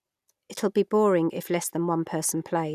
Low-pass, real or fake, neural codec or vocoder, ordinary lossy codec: 14.4 kHz; real; none; none